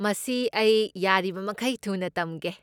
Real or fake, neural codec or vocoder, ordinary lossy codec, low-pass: real; none; none; 19.8 kHz